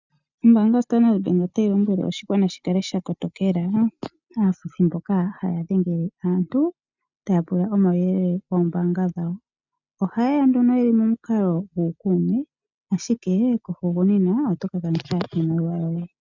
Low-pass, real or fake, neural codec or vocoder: 7.2 kHz; real; none